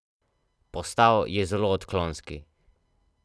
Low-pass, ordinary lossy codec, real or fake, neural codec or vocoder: none; none; real; none